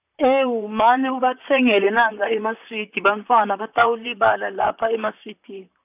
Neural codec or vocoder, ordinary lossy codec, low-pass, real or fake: vocoder, 44.1 kHz, 128 mel bands, Pupu-Vocoder; none; 3.6 kHz; fake